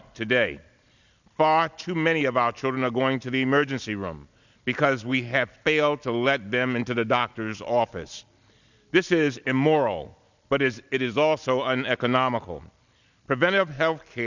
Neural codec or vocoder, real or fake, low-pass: none; real; 7.2 kHz